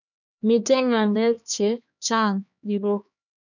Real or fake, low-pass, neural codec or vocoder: fake; 7.2 kHz; codec, 16 kHz, 2 kbps, X-Codec, HuBERT features, trained on LibriSpeech